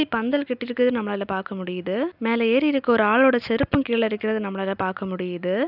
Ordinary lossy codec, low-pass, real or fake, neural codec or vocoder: none; 5.4 kHz; real; none